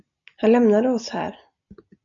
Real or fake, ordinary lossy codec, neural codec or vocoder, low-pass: real; MP3, 96 kbps; none; 7.2 kHz